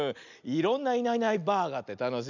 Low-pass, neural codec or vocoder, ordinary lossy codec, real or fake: 7.2 kHz; none; none; real